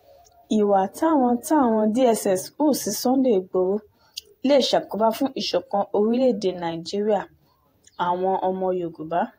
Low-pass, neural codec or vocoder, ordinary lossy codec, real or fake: 19.8 kHz; vocoder, 48 kHz, 128 mel bands, Vocos; AAC, 48 kbps; fake